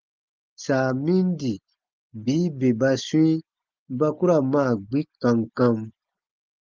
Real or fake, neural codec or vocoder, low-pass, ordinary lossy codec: real; none; 7.2 kHz; Opus, 32 kbps